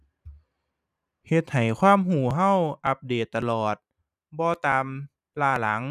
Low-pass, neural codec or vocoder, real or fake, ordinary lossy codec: 14.4 kHz; vocoder, 44.1 kHz, 128 mel bands every 512 samples, BigVGAN v2; fake; none